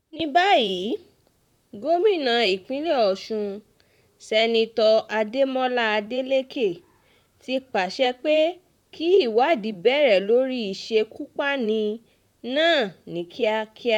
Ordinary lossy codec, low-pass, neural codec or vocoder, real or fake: none; 19.8 kHz; vocoder, 44.1 kHz, 128 mel bands, Pupu-Vocoder; fake